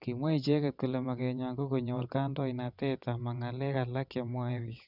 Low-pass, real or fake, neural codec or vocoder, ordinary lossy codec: 5.4 kHz; fake; vocoder, 22.05 kHz, 80 mel bands, WaveNeXt; none